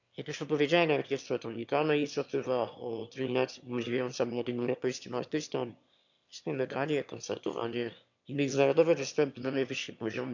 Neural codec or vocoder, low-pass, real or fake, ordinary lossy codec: autoencoder, 22.05 kHz, a latent of 192 numbers a frame, VITS, trained on one speaker; 7.2 kHz; fake; none